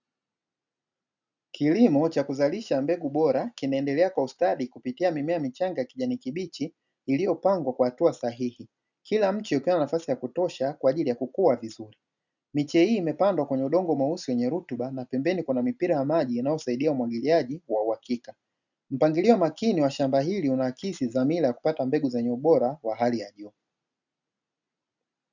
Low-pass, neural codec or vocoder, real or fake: 7.2 kHz; none; real